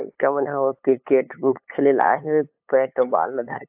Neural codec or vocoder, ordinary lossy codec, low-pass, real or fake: codec, 16 kHz, 8 kbps, FunCodec, trained on LibriTTS, 25 frames a second; AAC, 32 kbps; 3.6 kHz; fake